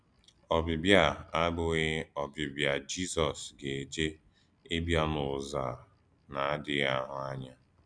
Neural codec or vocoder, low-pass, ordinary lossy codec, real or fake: none; 9.9 kHz; none; real